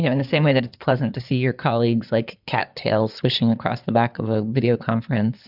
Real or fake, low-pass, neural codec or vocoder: fake; 5.4 kHz; codec, 16 kHz in and 24 kHz out, 2.2 kbps, FireRedTTS-2 codec